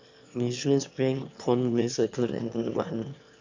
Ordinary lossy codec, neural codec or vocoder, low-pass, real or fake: MP3, 64 kbps; autoencoder, 22.05 kHz, a latent of 192 numbers a frame, VITS, trained on one speaker; 7.2 kHz; fake